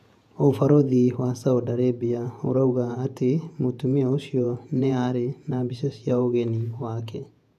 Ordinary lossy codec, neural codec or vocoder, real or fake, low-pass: none; vocoder, 48 kHz, 128 mel bands, Vocos; fake; 14.4 kHz